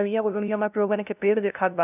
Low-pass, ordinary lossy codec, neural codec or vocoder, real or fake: 3.6 kHz; none; codec, 16 kHz, 0.5 kbps, FunCodec, trained on LibriTTS, 25 frames a second; fake